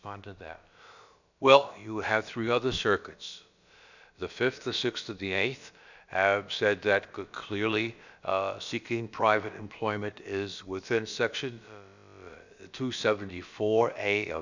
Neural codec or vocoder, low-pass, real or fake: codec, 16 kHz, about 1 kbps, DyCAST, with the encoder's durations; 7.2 kHz; fake